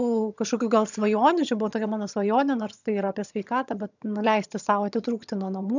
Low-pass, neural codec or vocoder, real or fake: 7.2 kHz; vocoder, 22.05 kHz, 80 mel bands, HiFi-GAN; fake